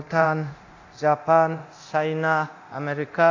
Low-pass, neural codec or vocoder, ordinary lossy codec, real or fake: 7.2 kHz; codec, 24 kHz, 0.9 kbps, DualCodec; AAC, 48 kbps; fake